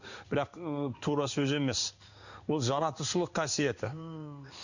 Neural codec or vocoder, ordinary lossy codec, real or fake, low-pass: codec, 16 kHz in and 24 kHz out, 1 kbps, XY-Tokenizer; none; fake; 7.2 kHz